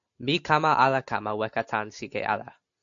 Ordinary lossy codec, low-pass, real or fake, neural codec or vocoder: AAC, 64 kbps; 7.2 kHz; real; none